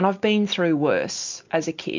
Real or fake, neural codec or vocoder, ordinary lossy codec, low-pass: real; none; MP3, 64 kbps; 7.2 kHz